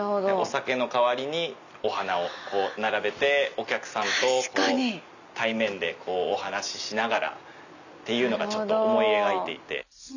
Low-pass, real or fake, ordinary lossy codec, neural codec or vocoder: 7.2 kHz; real; none; none